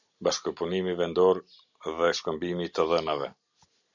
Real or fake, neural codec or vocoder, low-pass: real; none; 7.2 kHz